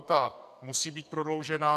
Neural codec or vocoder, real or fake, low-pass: codec, 44.1 kHz, 2.6 kbps, SNAC; fake; 14.4 kHz